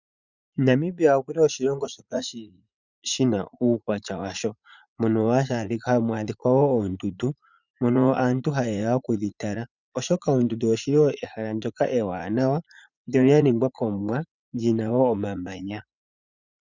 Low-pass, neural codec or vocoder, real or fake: 7.2 kHz; vocoder, 44.1 kHz, 80 mel bands, Vocos; fake